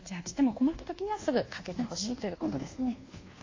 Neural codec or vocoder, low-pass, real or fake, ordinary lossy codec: codec, 24 kHz, 1.2 kbps, DualCodec; 7.2 kHz; fake; AAC, 32 kbps